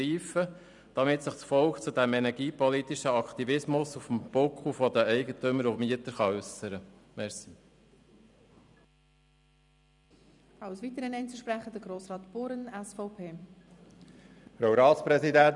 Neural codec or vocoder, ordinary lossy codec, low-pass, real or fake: vocoder, 44.1 kHz, 128 mel bands every 256 samples, BigVGAN v2; none; 10.8 kHz; fake